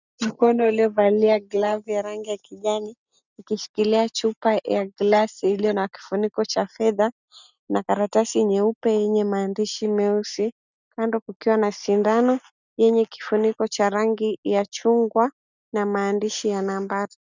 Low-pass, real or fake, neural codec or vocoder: 7.2 kHz; real; none